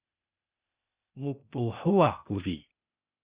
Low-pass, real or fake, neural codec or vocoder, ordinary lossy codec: 3.6 kHz; fake; codec, 16 kHz, 0.8 kbps, ZipCodec; Opus, 64 kbps